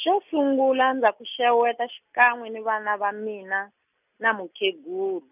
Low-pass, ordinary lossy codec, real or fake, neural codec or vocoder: 3.6 kHz; none; real; none